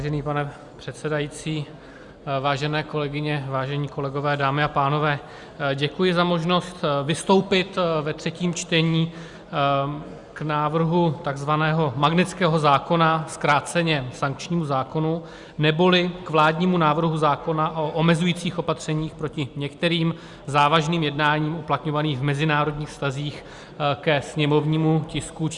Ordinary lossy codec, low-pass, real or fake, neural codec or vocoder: Opus, 64 kbps; 10.8 kHz; real; none